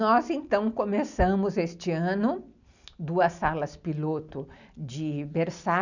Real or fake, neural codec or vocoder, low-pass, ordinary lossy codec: real; none; 7.2 kHz; none